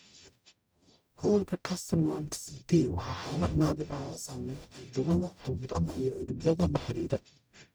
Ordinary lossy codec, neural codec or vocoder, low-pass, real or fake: none; codec, 44.1 kHz, 0.9 kbps, DAC; none; fake